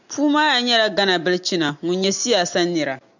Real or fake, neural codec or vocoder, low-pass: real; none; 7.2 kHz